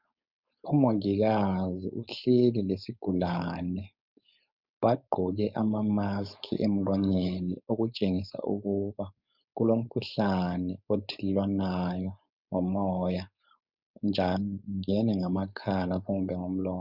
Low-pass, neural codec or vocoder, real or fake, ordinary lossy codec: 5.4 kHz; codec, 16 kHz, 4.8 kbps, FACodec; fake; Opus, 64 kbps